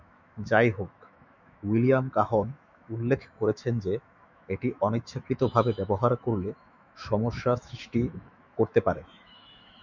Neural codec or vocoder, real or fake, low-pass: autoencoder, 48 kHz, 128 numbers a frame, DAC-VAE, trained on Japanese speech; fake; 7.2 kHz